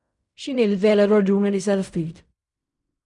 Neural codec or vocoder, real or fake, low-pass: codec, 16 kHz in and 24 kHz out, 0.4 kbps, LongCat-Audio-Codec, fine tuned four codebook decoder; fake; 10.8 kHz